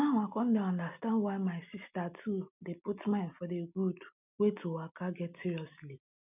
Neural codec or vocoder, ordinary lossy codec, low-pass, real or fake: none; none; 3.6 kHz; real